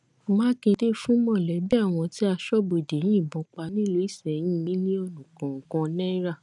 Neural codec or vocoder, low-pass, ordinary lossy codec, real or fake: none; 10.8 kHz; none; real